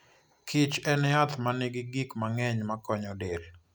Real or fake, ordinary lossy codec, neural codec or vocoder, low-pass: real; none; none; none